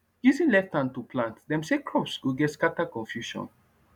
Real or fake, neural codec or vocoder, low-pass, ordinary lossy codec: real; none; 19.8 kHz; none